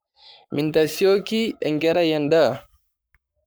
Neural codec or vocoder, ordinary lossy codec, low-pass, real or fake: codec, 44.1 kHz, 7.8 kbps, Pupu-Codec; none; none; fake